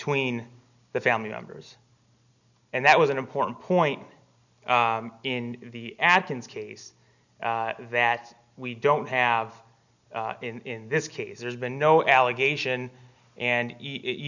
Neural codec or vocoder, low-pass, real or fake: none; 7.2 kHz; real